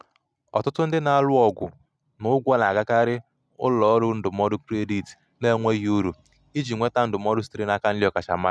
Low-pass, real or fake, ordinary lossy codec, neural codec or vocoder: none; real; none; none